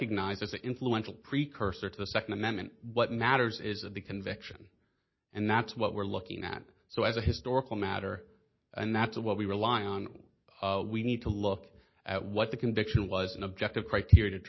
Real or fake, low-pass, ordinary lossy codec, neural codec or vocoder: real; 7.2 kHz; MP3, 24 kbps; none